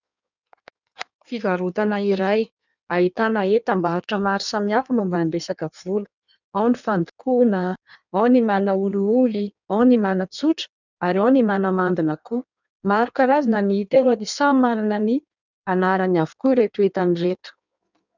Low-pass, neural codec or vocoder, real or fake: 7.2 kHz; codec, 16 kHz in and 24 kHz out, 1.1 kbps, FireRedTTS-2 codec; fake